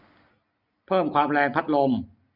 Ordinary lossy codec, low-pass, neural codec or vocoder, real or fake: none; 5.4 kHz; none; real